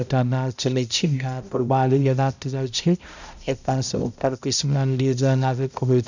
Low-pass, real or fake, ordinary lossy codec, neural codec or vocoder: 7.2 kHz; fake; none; codec, 16 kHz, 0.5 kbps, X-Codec, HuBERT features, trained on balanced general audio